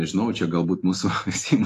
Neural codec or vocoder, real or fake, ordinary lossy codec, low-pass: none; real; AAC, 48 kbps; 14.4 kHz